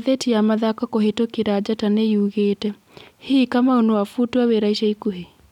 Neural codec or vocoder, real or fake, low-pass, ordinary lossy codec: none; real; 19.8 kHz; none